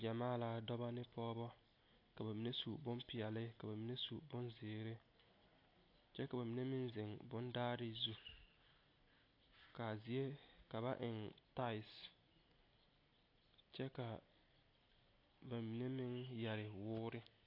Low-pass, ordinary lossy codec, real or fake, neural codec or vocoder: 5.4 kHz; AAC, 32 kbps; real; none